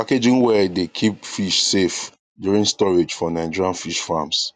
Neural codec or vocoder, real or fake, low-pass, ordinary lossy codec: none; real; 10.8 kHz; none